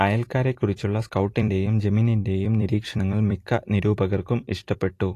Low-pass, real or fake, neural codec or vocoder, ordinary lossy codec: 14.4 kHz; fake; vocoder, 44.1 kHz, 128 mel bands every 256 samples, BigVGAN v2; AAC, 48 kbps